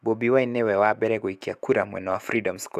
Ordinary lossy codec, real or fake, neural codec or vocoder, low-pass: AAC, 96 kbps; fake; autoencoder, 48 kHz, 128 numbers a frame, DAC-VAE, trained on Japanese speech; 14.4 kHz